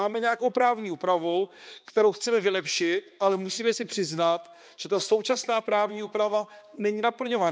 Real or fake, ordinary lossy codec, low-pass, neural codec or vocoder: fake; none; none; codec, 16 kHz, 2 kbps, X-Codec, HuBERT features, trained on balanced general audio